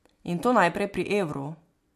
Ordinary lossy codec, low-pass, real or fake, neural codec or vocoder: MP3, 64 kbps; 14.4 kHz; real; none